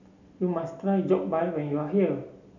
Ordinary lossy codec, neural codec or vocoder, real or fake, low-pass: none; none; real; 7.2 kHz